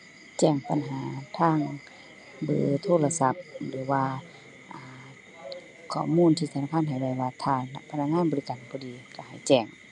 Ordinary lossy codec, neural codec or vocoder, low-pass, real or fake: none; none; 10.8 kHz; real